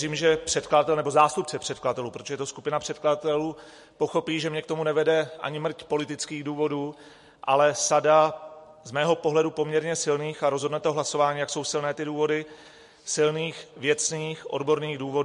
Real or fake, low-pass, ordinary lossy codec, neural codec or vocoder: real; 14.4 kHz; MP3, 48 kbps; none